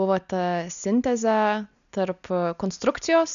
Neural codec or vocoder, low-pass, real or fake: none; 7.2 kHz; real